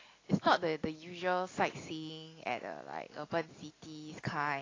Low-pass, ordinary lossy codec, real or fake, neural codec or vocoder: 7.2 kHz; AAC, 32 kbps; real; none